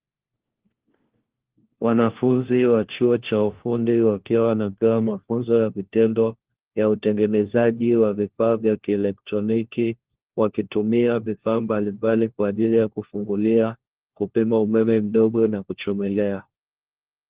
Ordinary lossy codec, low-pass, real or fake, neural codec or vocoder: Opus, 16 kbps; 3.6 kHz; fake; codec, 16 kHz, 1 kbps, FunCodec, trained on LibriTTS, 50 frames a second